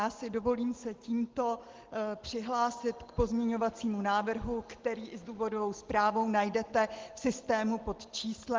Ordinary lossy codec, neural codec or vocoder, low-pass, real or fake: Opus, 16 kbps; none; 7.2 kHz; real